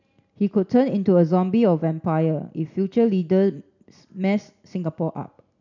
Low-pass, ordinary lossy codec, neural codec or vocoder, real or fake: 7.2 kHz; none; none; real